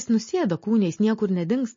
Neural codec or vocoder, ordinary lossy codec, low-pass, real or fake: none; MP3, 32 kbps; 7.2 kHz; real